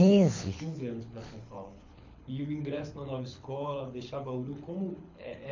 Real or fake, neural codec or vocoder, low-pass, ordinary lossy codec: fake; codec, 24 kHz, 6 kbps, HILCodec; 7.2 kHz; MP3, 48 kbps